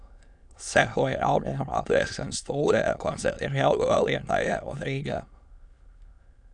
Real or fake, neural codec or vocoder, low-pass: fake; autoencoder, 22.05 kHz, a latent of 192 numbers a frame, VITS, trained on many speakers; 9.9 kHz